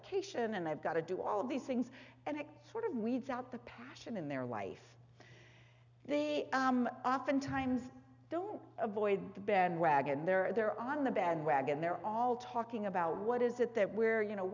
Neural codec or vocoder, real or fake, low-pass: none; real; 7.2 kHz